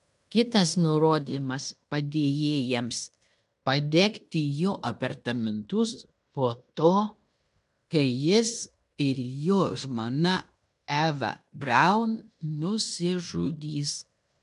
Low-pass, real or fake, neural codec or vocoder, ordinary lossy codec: 10.8 kHz; fake; codec, 16 kHz in and 24 kHz out, 0.9 kbps, LongCat-Audio-Codec, fine tuned four codebook decoder; MP3, 96 kbps